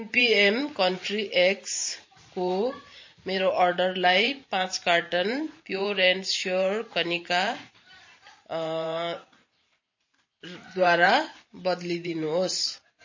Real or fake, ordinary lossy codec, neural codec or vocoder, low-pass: fake; MP3, 32 kbps; vocoder, 44.1 kHz, 128 mel bands every 512 samples, BigVGAN v2; 7.2 kHz